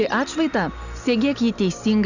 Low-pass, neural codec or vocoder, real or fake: 7.2 kHz; none; real